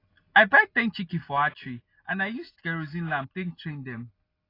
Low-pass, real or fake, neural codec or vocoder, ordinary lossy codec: 5.4 kHz; real; none; AAC, 24 kbps